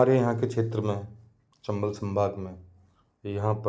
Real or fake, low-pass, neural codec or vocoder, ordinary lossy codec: real; none; none; none